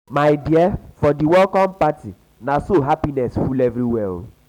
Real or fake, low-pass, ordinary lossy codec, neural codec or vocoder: real; 19.8 kHz; none; none